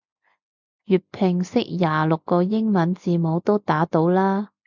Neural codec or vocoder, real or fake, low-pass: none; real; 7.2 kHz